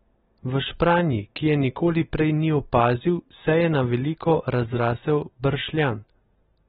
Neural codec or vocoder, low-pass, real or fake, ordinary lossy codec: none; 7.2 kHz; real; AAC, 16 kbps